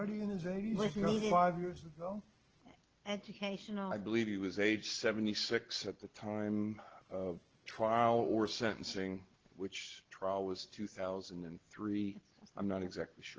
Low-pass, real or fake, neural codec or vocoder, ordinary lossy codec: 7.2 kHz; real; none; Opus, 16 kbps